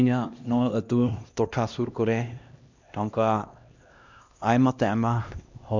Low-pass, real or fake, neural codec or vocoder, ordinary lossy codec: 7.2 kHz; fake; codec, 16 kHz, 1 kbps, X-Codec, HuBERT features, trained on LibriSpeech; MP3, 64 kbps